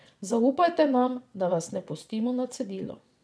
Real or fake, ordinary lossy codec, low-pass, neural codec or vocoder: fake; none; none; vocoder, 22.05 kHz, 80 mel bands, WaveNeXt